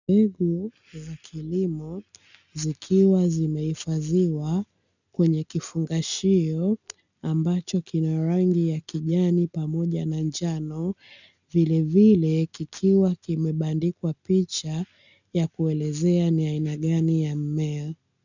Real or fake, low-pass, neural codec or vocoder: real; 7.2 kHz; none